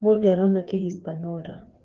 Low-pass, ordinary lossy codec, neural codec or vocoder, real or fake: 7.2 kHz; Opus, 16 kbps; codec, 16 kHz, 2 kbps, FreqCodec, larger model; fake